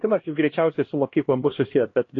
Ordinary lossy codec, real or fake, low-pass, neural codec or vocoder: AAC, 32 kbps; fake; 7.2 kHz; codec, 16 kHz, 1 kbps, X-Codec, HuBERT features, trained on LibriSpeech